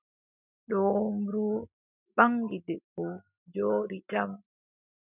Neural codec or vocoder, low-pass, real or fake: none; 3.6 kHz; real